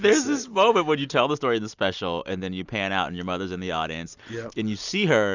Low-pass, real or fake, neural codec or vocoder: 7.2 kHz; real; none